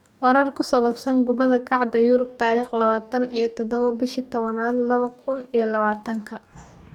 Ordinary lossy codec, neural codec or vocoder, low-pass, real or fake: none; codec, 44.1 kHz, 2.6 kbps, DAC; 19.8 kHz; fake